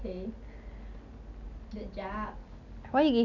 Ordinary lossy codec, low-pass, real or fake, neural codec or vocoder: none; 7.2 kHz; real; none